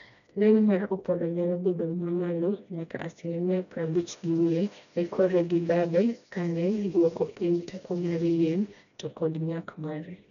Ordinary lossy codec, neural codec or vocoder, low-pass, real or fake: none; codec, 16 kHz, 1 kbps, FreqCodec, smaller model; 7.2 kHz; fake